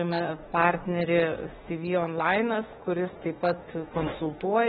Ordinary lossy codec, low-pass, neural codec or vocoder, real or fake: AAC, 16 kbps; 19.8 kHz; autoencoder, 48 kHz, 32 numbers a frame, DAC-VAE, trained on Japanese speech; fake